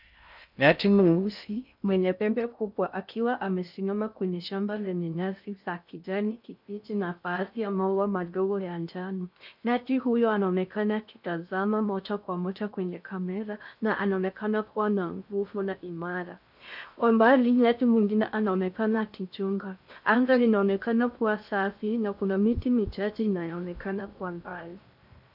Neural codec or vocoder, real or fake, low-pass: codec, 16 kHz in and 24 kHz out, 0.6 kbps, FocalCodec, streaming, 2048 codes; fake; 5.4 kHz